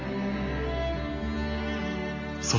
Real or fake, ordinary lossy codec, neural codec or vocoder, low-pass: real; AAC, 32 kbps; none; 7.2 kHz